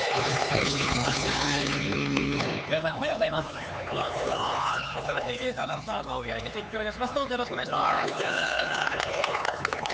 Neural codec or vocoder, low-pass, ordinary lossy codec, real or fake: codec, 16 kHz, 4 kbps, X-Codec, HuBERT features, trained on LibriSpeech; none; none; fake